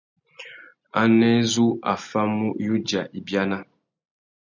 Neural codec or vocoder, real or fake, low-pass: none; real; 7.2 kHz